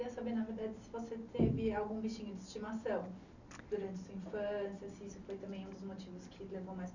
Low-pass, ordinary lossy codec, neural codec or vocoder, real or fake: 7.2 kHz; none; none; real